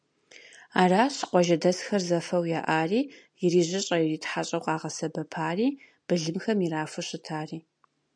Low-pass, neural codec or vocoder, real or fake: 9.9 kHz; none; real